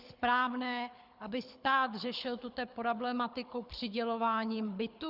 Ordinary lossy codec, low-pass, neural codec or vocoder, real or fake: Opus, 64 kbps; 5.4 kHz; codec, 24 kHz, 6 kbps, HILCodec; fake